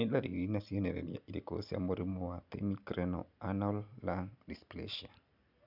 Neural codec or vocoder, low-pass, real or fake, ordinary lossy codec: none; 5.4 kHz; real; none